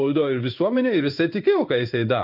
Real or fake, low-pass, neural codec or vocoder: fake; 5.4 kHz; codec, 16 kHz in and 24 kHz out, 1 kbps, XY-Tokenizer